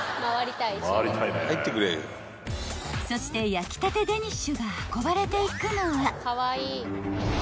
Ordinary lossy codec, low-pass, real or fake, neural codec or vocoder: none; none; real; none